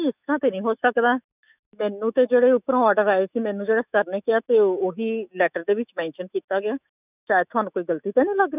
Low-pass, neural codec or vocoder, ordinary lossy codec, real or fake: 3.6 kHz; autoencoder, 48 kHz, 128 numbers a frame, DAC-VAE, trained on Japanese speech; none; fake